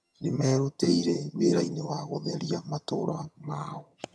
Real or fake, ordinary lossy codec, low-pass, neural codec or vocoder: fake; none; none; vocoder, 22.05 kHz, 80 mel bands, HiFi-GAN